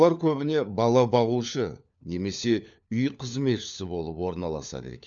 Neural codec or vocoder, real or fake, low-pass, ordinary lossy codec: codec, 16 kHz, 4 kbps, FunCodec, trained on LibriTTS, 50 frames a second; fake; 7.2 kHz; none